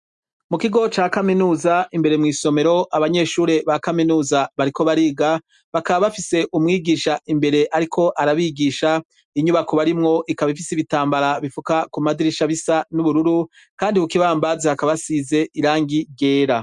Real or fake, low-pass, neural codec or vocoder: real; 10.8 kHz; none